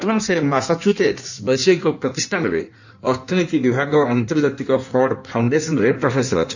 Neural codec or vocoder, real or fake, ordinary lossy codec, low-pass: codec, 16 kHz in and 24 kHz out, 1.1 kbps, FireRedTTS-2 codec; fake; none; 7.2 kHz